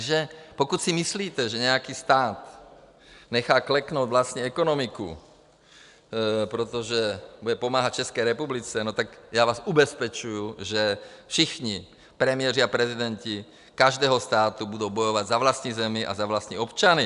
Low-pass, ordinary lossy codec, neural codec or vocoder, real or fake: 10.8 kHz; AAC, 96 kbps; none; real